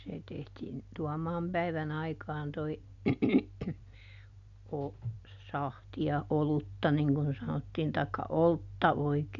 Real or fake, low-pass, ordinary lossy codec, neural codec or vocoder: real; 7.2 kHz; none; none